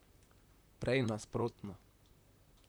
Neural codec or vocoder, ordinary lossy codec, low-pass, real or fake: vocoder, 44.1 kHz, 128 mel bands, Pupu-Vocoder; none; none; fake